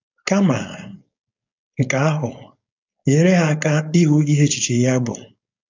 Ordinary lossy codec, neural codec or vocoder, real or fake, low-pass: AAC, 48 kbps; codec, 16 kHz, 4.8 kbps, FACodec; fake; 7.2 kHz